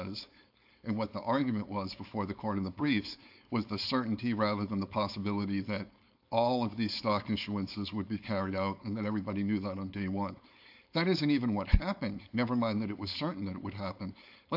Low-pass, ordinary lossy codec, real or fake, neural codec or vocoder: 5.4 kHz; AAC, 48 kbps; fake; codec, 16 kHz, 4.8 kbps, FACodec